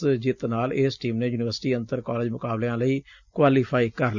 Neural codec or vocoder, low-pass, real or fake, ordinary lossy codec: none; 7.2 kHz; real; Opus, 64 kbps